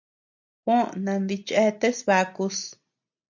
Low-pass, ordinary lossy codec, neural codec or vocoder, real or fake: 7.2 kHz; MP3, 64 kbps; none; real